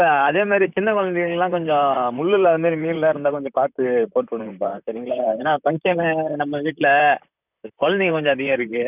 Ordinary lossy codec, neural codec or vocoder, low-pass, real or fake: none; vocoder, 44.1 kHz, 128 mel bands, Pupu-Vocoder; 3.6 kHz; fake